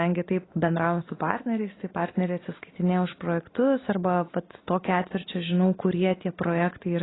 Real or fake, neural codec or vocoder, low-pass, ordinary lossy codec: real; none; 7.2 kHz; AAC, 16 kbps